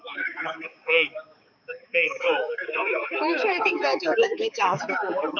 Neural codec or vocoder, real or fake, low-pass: codec, 16 kHz, 4 kbps, X-Codec, HuBERT features, trained on balanced general audio; fake; 7.2 kHz